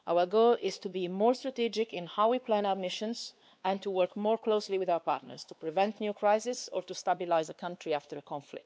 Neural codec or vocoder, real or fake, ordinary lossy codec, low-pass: codec, 16 kHz, 2 kbps, X-Codec, WavLM features, trained on Multilingual LibriSpeech; fake; none; none